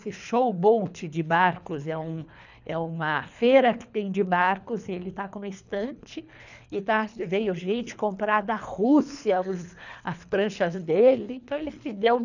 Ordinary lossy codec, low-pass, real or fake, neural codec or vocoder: none; 7.2 kHz; fake; codec, 24 kHz, 3 kbps, HILCodec